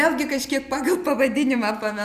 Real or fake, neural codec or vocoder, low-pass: real; none; 14.4 kHz